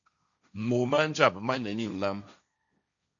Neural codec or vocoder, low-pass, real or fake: codec, 16 kHz, 1.1 kbps, Voila-Tokenizer; 7.2 kHz; fake